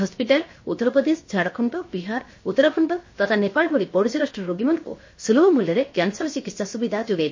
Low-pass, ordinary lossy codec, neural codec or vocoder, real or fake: 7.2 kHz; MP3, 32 kbps; codec, 16 kHz, 0.7 kbps, FocalCodec; fake